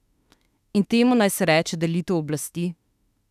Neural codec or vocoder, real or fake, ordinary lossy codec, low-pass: autoencoder, 48 kHz, 32 numbers a frame, DAC-VAE, trained on Japanese speech; fake; none; 14.4 kHz